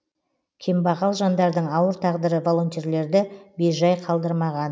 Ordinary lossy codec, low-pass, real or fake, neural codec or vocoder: none; none; real; none